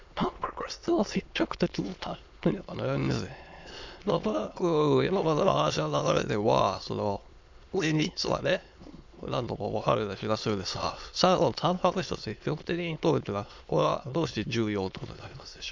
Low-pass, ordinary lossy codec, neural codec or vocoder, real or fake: 7.2 kHz; MP3, 64 kbps; autoencoder, 22.05 kHz, a latent of 192 numbers a frame, VITS, trained on many speakers; fake